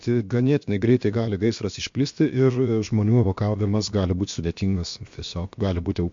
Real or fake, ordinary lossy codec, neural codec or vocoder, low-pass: fake; MP3, 48 kbps; codec, 16 kHz, about 1 kbps, DyCAST, with the encoder's durations; 7.2 kHz